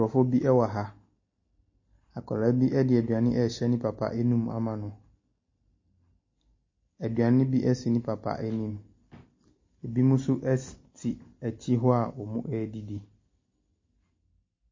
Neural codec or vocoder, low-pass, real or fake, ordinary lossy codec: none; 7.2 kHz; real; MP3, 32 kbps